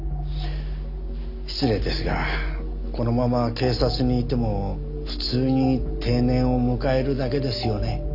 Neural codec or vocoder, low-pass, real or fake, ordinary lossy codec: none; 5.4 kHz; real; AAC, 48 kbps